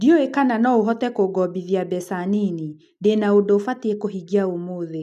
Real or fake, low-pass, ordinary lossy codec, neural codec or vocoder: real; 14.4 kHz; none; none